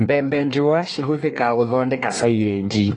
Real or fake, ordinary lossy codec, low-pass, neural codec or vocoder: fake; AAC, 32 kbps; 10.8 kHz; codec, 24 kHz, 1 kbps, SNAC